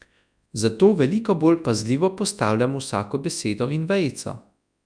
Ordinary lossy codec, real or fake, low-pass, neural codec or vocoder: none; fake; 9.9 kHz; codec, 24 kHz, 0.9 kbps, WavTokenizer, large speech release